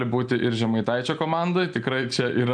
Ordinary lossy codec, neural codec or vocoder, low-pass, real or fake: MP3, 96 kbps; none; 9.9 kHz; real